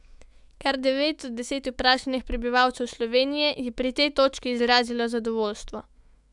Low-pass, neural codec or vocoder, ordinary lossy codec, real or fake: 10.8 kHz; autoencoder, 48 kHz, 128 numbers a frame, DAC-VAE, trained on Japanese speech; none; fake